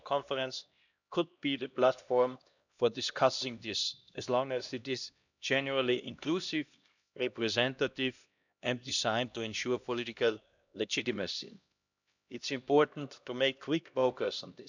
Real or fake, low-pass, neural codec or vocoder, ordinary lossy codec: fake; 7.2 kHz; codec, 16 kHz, 1 kbps, X-Codec, HuBERT features, trained on LibriSpeech; none